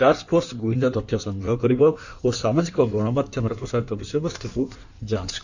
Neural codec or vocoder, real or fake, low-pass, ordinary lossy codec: codec, 16 kHz in and 24 kHz out, 1.1 kbps, FireRedTTS-2 codec; fake; 7.2 kHz; none